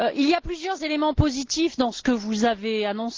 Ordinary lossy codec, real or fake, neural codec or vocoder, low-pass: Opus, 16 kbps; real; none; 7.2 kHz